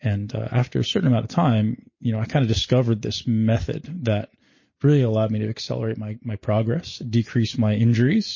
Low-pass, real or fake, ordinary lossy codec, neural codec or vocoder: 7.2 kHz; real; MP3, 32 kbps; none